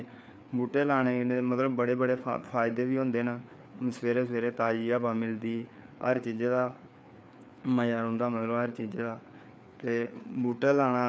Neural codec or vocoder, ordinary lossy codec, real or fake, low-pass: codec, 16 kHz, 4 kbps, FreqCodec, larger model; none; fake; none